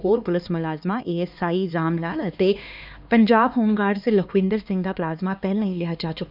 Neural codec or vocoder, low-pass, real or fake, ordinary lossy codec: codec, 16 kHz, 2 kbps, X-Codec, HuBERT features, trained on LibriSpeech; 5.4 kHz; fake; none